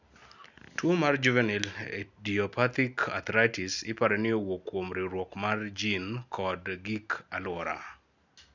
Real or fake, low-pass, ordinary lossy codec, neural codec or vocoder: real; 7.2 kHz; none; none